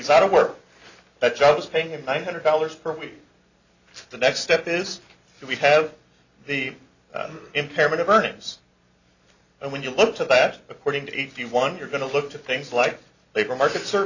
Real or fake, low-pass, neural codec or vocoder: real; 7.2 kHz; none